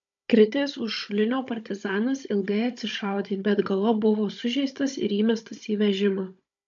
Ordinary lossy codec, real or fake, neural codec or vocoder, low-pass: AAC, 64 kbps; fake; codec, 16 kHz, 16 kbps, FunCodec, trained on Chinese and English, 50 frames a second; 7.2 kHz